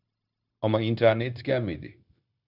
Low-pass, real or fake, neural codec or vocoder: 5.4 kHz; fake; codec, 16 kHz, 0.9 kbps, LongCat-Audio-Codec